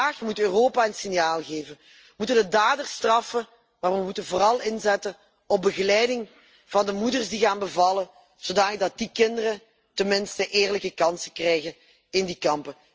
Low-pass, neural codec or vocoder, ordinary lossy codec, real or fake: 7.2 kHz; none; Opus, 24 kbps; real